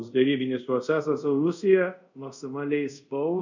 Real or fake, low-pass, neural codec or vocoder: fake; 7.2 kHz; codec, 24 kHz, 0.5 kbps, DualCodec